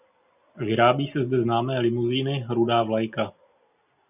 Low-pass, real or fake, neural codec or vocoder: 3.6 kHz; real; none